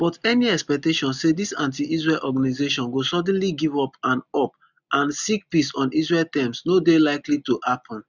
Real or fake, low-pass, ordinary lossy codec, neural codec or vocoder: real; 7.2 kHz; none; none